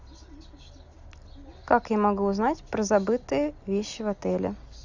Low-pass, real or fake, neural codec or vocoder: 7.2 kHz; real; none